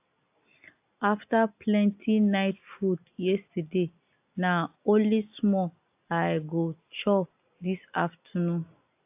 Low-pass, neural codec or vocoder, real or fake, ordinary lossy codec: 3.6 kHz; none; real; AAC, 32 kbps